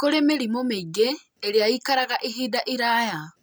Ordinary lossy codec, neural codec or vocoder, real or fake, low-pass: none; none; real; none